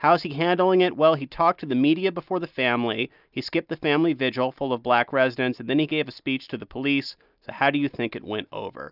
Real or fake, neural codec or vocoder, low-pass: real; none; 5.4 kHz